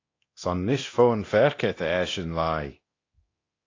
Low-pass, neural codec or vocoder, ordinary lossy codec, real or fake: 7.2 kHz; codec, 24 kHz, 0.9 kbps, DualCodec; AAC, 32 kbps; fake